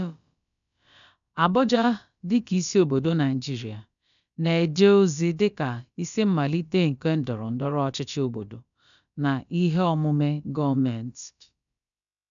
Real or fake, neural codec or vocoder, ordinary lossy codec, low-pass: fake; codec, 16 kHz, about 1 kbps, DyCAST, with the encoder's durations; none; 7.2 kHz